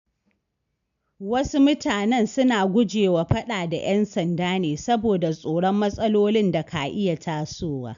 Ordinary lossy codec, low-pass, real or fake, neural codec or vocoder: AAC, 96 kbps; 7.2 kHz; real; none